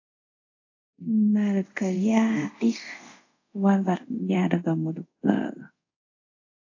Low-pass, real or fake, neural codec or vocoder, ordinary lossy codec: 7.2 kHz; fake; codec, 24 kHz, 0.5 kbps, DualCodec; AAC, 48 kbps